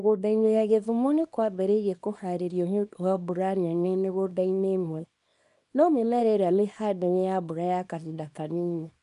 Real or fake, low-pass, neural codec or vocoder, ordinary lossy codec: fake; 10.8 kHz; codec, 24 kHz, 0.9 kbps, WavTokenizer, small release; none